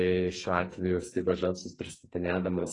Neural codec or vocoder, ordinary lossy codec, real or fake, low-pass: codec, 44.1 kHz, 2.6 kbps, SNAC; AAC, 32 kbps; fake; 10.8 kHz